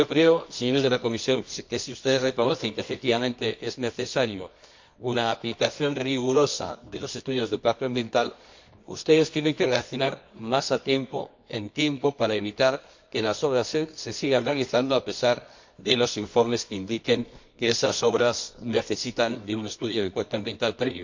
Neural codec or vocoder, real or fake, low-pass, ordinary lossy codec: codec, 24 kHz, 0.9 kbps, WavTokenizer, medium music audio release; fake; 7.2 kHz; MP3, 48 kbps